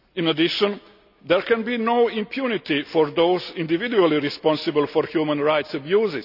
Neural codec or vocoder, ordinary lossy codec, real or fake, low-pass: none; none; real; 5.4 kHz